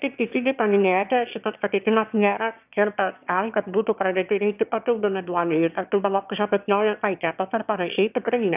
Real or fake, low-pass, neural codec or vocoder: fake; 3.6 kHz; autoencoder, 22.05 kHz, a latent of 192 numbers a frame, VITS, trained on one speaker